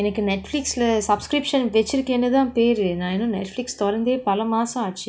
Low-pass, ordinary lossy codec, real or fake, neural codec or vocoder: none; none; real; none